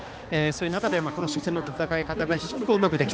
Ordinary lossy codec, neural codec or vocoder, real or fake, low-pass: none; codec, 16 kHz, 2 kbps, X-Codec, HuBERT features, trained on balanced general audio; fake; none